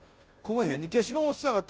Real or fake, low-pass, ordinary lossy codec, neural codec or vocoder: fake; none; none; codec, 16 kHz, 0.5 kbps, FunCodec, trained on Chinese and English, 25 frames a second